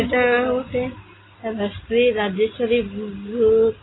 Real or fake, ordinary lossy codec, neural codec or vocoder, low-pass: real; AAC, 16 kbps; none; 7.2 kHz